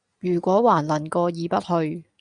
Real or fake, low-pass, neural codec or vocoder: real; 9.9 kHz; none